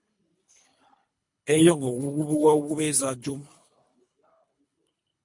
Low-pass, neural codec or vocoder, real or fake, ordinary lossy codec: 10.8 kHz; codec, 24 kHz, 3 kbps, HILCodec; fake; MP3, 48 kbps